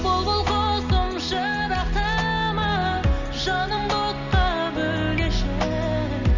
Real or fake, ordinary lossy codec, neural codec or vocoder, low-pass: real; none; none; 7.2 kHz